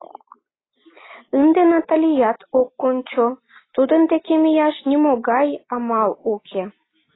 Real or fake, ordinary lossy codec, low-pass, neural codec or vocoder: real; AAC, 16 kbps; 7.2 kHz; none